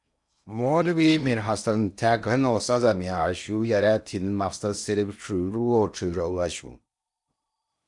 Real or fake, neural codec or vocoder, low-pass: fake; codec, 16 kHz in and 24 kHz out, 0.8 kbps, FocalCodec, streaming, 65536 codes; 10.8 kHz